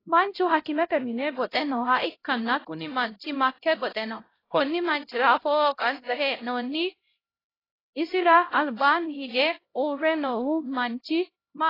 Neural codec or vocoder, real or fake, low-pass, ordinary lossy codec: codec, 16 kHz, 0.5 kbps, X-Codec, HuBERT features, trained on LibriSpeech; fake; 5.4 kHz; AAC, 24 kbps